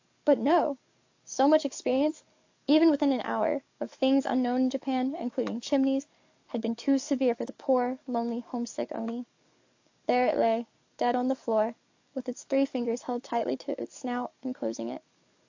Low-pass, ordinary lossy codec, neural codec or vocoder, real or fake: 7.2 kHz; AAC, 48 kbps; codec, 44.1 kHz, 7.8 kbps, DAC; fake